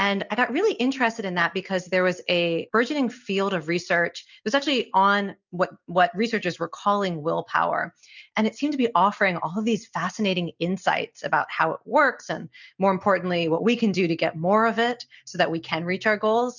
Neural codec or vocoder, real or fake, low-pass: none; real; 7.2 kHz